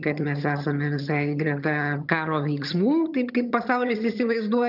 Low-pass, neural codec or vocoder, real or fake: 5.4 kHz; vocoder, 22.05 kHz, 80 mel bands, HiFi-GAN; fake